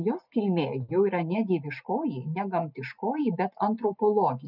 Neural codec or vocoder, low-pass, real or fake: none; 5.4 kHz; real